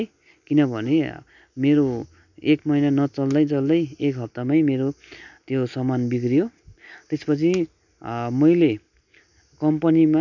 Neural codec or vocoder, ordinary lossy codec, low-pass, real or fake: none; none; 7.2 kHz; real